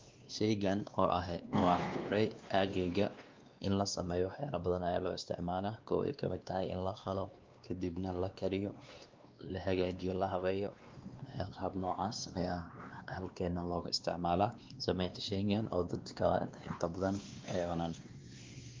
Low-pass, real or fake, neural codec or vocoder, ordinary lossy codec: 7.2 kHz; fake; codec, 16 kHz, 2 kbps, X-Codec, WavLM features, trained on Multilingual LibriSpeech; Opus, 24 kbps